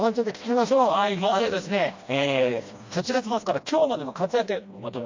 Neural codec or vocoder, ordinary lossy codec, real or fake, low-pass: codec, 16 kHz, 1 kbps, FreqCodec, smaller model; MP3, 48 kbps; fake; 7.2 kHz